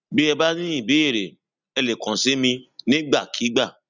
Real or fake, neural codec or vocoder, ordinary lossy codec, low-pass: real; none; none; 7.2 kHz